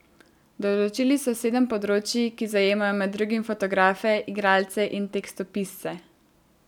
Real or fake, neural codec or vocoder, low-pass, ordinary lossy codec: real; none; 19.8 kHz; none